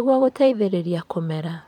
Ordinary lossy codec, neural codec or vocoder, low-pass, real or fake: MP3, 96 kbps; vocoder, 44.1 kHz, 128 mel bands every 512 samples, BigVGAN v2; 19.8 kHz; fake